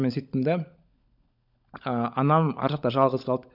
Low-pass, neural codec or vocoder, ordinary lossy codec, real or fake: 5.4 kHz; codec, 16 kHz, 16 kbps, FunCodec, trained on LibriTTS, 50 frames a second; none; fake